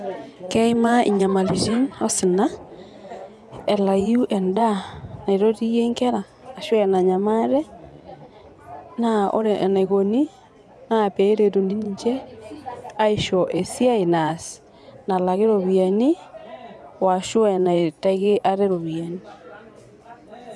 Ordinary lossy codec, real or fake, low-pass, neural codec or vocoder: none; fake; none; vocoder, 24 kHz, 100 mel bands, Vocos